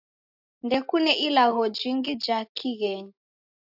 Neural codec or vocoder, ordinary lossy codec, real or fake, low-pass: none; AAC, 48 kbps; real; 5.4 kHz